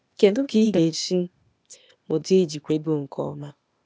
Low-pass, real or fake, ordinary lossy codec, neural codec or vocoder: none; fake; none; codec, 16 kHz, 0.8 kbps, ZipCodec